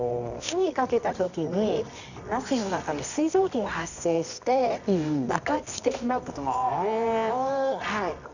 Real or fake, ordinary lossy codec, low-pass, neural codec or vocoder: fake; none; 7.2 kHz; codec, 24 kHz, 0.9 kbps, WavTokenizer, medium music audio release